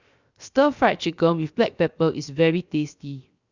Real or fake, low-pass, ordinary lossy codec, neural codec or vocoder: fake; 7.2 kHz; Opus, 64 kbps; codec, 16 kHz, 0.7 kbps, FocalCodec